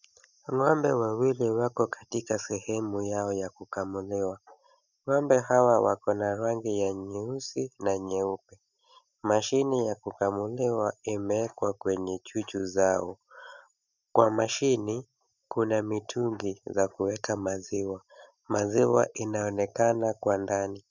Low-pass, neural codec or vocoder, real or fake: 7.2 kHz; none; real